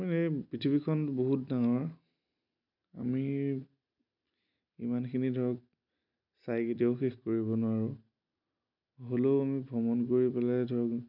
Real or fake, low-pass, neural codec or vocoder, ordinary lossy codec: real; 5.4 kHz; none; none